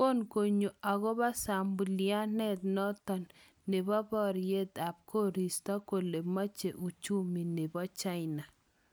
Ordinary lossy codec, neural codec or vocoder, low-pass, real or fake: none; none; none; real